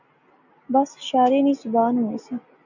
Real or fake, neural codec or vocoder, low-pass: real; none; 7.2 kHz